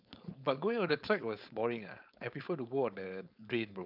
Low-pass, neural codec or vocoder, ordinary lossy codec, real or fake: 5.4 kHz; codec, 16 kHz, 4.8 kbps, FACodec; none; fake